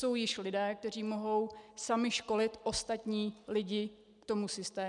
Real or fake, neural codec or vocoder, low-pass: real; none; 10.8 kHz